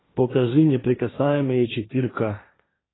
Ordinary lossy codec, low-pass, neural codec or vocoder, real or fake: AAC, 16 kbps; 7.2 kHz; codec, 16 kHz, 1.1 kbps, Voila-Tokenizer; fake